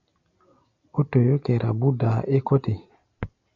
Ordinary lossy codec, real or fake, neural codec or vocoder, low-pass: MP3, 64 kbps; real; none; 7.2 kHz